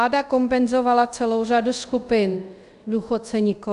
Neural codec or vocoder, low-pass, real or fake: codec, 24 kHz, 0.5 kbps, DualCodec; 10.8 kHz; fake